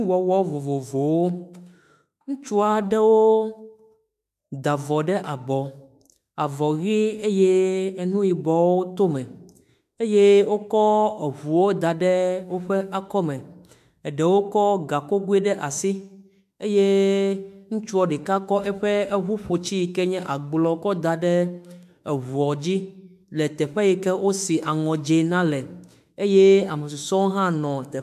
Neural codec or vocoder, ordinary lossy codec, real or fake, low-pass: autoencoder, 48 kHz, 32 numbers a frame, DAC-VAE, trained on Japanese speech; MP3, 96 kbps; fake; 14.4 kHz